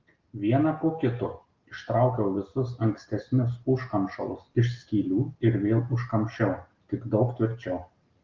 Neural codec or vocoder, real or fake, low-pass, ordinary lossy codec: none; real; 7.2 kHz; Opus, 32 kbps